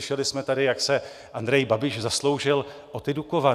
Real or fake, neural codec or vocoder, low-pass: real; none; 14.4 kHz